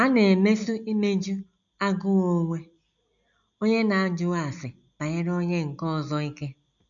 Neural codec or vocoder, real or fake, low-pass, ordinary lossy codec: none; real; 7.2 kHz; none